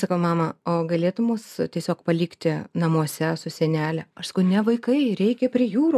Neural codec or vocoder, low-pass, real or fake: none; 14.4 kHz; real